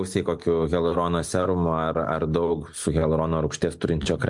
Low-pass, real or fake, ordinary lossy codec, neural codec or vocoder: 10.8 kHz; real; MP3, 64 kbps; none